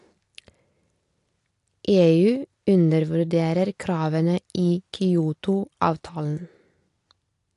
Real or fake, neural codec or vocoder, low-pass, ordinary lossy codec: real; none; 10.8 kHz; AAC, 48 kbps